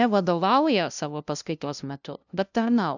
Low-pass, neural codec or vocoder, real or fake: 7.2 kHz; codec, 16 kHz, 0.5 kbps, FunCodec, trained on LibriTTS, 25 frames a second; fake